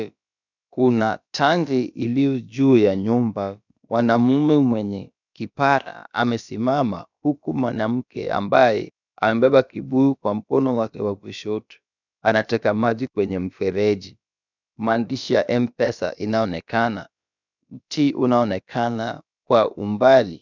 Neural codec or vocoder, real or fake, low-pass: codec, 16 kHz, about 1 kbps, DyCAST, with the encoder's durations; fake; 7.2 kHz